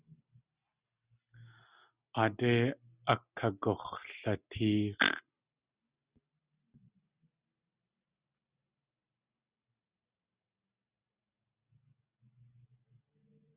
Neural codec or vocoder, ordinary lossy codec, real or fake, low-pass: none; Opus, 64 kbps; real; 3.6 kHz